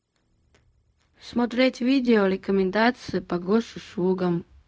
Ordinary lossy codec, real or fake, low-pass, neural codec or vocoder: none; fake; none; codec, 16 kHz, 0.4 kbps, LongCat-Audio-Codec